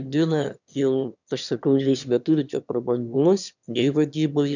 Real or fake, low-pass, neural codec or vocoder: fake; 7.2 kHz; autoencoder, 22.05 kHz, a latent of 192 numbers a frame, VITS, trained on one speaker